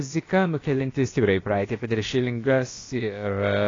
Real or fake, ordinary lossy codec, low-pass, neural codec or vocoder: fake; AAC, 32 kbps; 7.2 kHz; codec, 16 kHz, about 1 kbps, DyCAST, with the encoder's durations